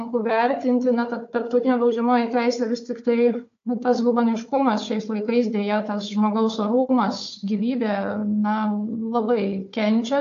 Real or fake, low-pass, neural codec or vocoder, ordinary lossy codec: fake; 7.2 kHz; codec, 16 kHz, 4 kbps, FunCodec, trained on Chinese and English, 50 frames a second; AAC, 48 kbps